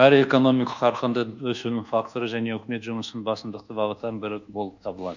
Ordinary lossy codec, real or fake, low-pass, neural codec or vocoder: none; fake; 7.2 kHz; codec, 24 kHz, 1.2 kbps, DualCodec